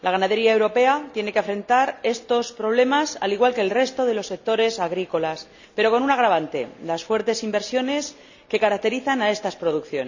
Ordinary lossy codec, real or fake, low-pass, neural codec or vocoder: none; real; 7.2 kHz; none